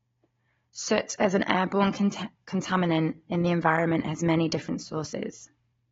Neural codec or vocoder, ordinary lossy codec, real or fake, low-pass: codec, 16 kHz, 16 kbps, FunCodec, trained on Chinese and English, 50 frames a second; AAC, 24 kbps; fake; 7.2 kHz